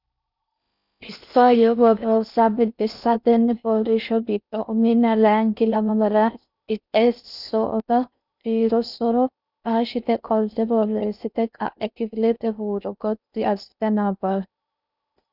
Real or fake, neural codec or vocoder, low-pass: fake; codec, 16 kHz in and 24 kHz out, 0.6 kbps, FocalCodec, streaming, 4096 codes; 5.4 kHz